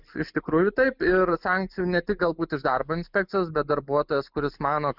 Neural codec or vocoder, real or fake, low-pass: none; real; 5.4 kHz